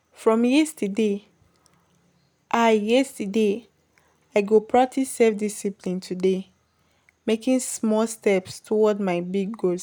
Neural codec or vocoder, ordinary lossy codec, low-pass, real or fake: none; none; none; real